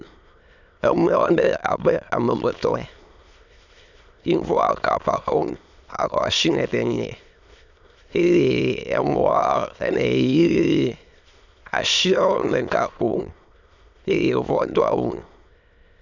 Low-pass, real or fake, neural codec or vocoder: 7.2 kHz; fake; autoencoder, 22.05 kHz, a latent of 192 numbers a frame, VITS, trained on many speakers